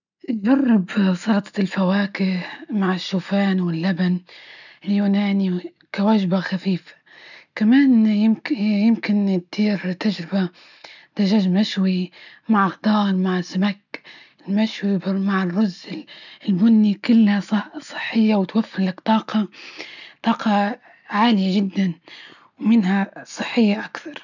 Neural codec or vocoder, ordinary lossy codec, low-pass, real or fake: none; none; 7.2 kHz; real